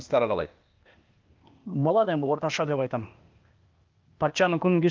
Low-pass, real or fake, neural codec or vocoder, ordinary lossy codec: 7.2 kHz; fake; codec, 16 kHz, 0.8 kbps, ZipCodec; Opus, 32 kbps